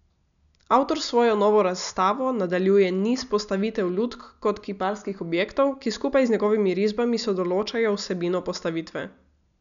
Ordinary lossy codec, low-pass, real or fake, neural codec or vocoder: none; 7.2 kHz; real; none